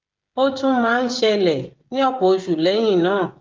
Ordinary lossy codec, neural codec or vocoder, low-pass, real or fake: Opus, 24 kbps; codec, 16 kHz, 16 kbps, FreqCodec, smaller model; 7.2 kHz; fake